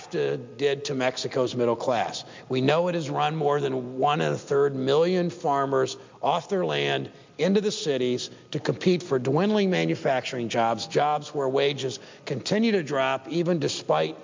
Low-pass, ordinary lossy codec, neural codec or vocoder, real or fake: 7.2 kHz; MP3, 64 kbps; none; real